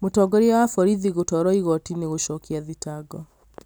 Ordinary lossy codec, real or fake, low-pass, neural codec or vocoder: none; real; none; none